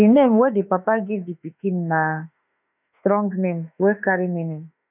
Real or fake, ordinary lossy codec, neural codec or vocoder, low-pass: fake; none; autoencoder, 48 kHz, 32 numbers a frame, DAC-VAE, trained on Japanese speech; 3.6 kHz